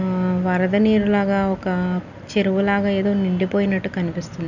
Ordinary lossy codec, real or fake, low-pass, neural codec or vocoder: none; real; 7.2 kHz; none